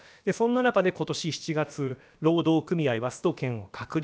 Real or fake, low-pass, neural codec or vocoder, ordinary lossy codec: fake; none; codec, 16 kHz, about 1 kbps, DyCAST, with the encoder's durations; none